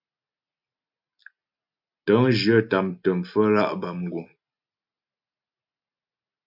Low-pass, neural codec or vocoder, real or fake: 5.4 kHz; none; real